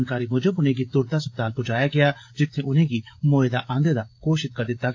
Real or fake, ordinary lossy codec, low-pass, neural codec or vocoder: fake; AAC, 48 kbps; 7.2 kHz; codec, 16 kHz, 16 kbps, FreqCodec, smaller model